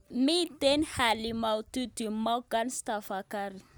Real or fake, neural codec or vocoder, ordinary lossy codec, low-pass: real; none; none; none